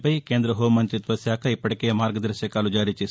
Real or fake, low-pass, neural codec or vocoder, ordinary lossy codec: real; none; none; none